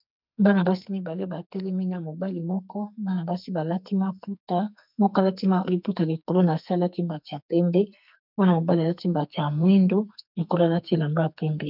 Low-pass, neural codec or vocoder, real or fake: 5.4 kHz; codec, 44.1 kHz, 2.6 kbps, SNAC; fake